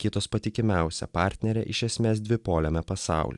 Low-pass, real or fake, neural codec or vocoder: 10.8 kHz; real; none